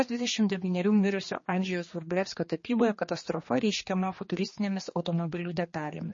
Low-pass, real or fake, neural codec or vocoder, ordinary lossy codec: 7.2 kHz; fake; codec, 16 kHz, 2 kbps, X-Codec, HuBERT features, trained on general audio; MP3, 32 kbps